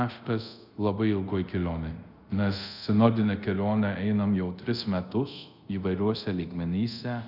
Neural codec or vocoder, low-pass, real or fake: codec, 24 kHz, 0.5 kbps, DualCodec; 5.4 kHz; fake